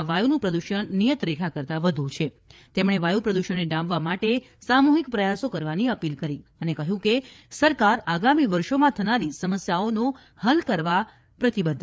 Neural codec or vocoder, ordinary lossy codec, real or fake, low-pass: codec, 16 kHz, 4 kbps, FreqCodec, larger model; none; fake; none